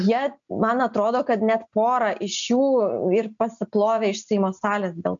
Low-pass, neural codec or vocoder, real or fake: 7.2 kHz; none; real